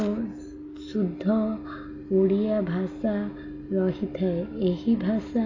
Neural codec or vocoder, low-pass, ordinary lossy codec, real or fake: none; 7.2 kHz; AAC, 32 kbps; real